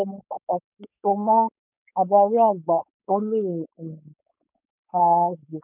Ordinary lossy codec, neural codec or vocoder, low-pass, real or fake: none; codec, 16 kHz, 4.8 kbps, FACodec; 3.6 kHz; fake